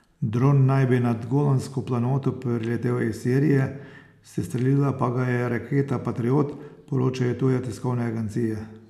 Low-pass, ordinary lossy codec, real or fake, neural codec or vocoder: 14.4 kHz; none; real; none